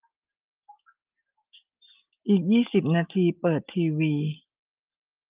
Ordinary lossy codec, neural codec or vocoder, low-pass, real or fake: Opus, 32 kbps; none; 3.6 kHz; real